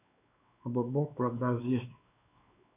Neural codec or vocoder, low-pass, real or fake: codec, 16 kHz, 2 kbps, X-Codec, WavLM features, trained on Multilingual LibriSpeech; 3.6 kHz; fake